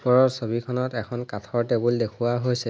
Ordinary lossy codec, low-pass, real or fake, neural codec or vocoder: none; none; real; none